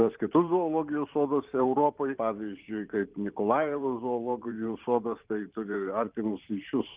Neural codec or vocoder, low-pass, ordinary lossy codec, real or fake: vocoder, 44.1 kHz, 128 mel bands every 512 samples, BigVGAN v2; 3.6 kHz; Opus, 32 kbps; fake